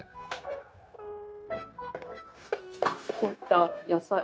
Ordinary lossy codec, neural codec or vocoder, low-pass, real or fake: none; codec, 16 kHz, 0.9 kbps, LongCat-Audio-Codec; none; fake